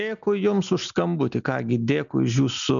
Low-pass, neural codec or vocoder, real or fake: 7.2 kHz; none; real